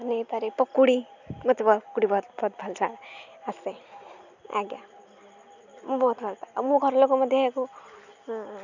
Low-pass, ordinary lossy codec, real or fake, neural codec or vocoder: 7.2 kHz; none; real; none